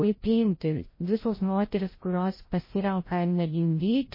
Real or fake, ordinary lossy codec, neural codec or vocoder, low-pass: fake; MP3, 24 kbps; codec, 16 kHz, 0.5 kbps, FreqCodec, larger model; 5.4 kHz